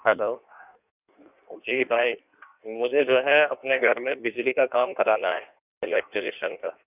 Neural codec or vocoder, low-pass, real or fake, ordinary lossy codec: codec, 16 kHz in and 24 kHz out, 1.1 kbps, FireRedTTS-2 codec; 3.6 kHz; fake; none